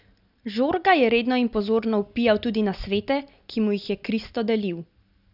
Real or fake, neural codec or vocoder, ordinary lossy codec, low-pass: real; none; none; 5.4 kHz